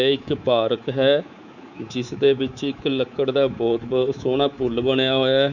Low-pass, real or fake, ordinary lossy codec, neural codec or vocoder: 7.2 kHz; fake; none; codec, 24 kHz, 3.1 kbps, DualCodec